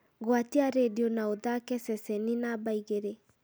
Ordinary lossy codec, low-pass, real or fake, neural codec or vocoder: none; none; real; none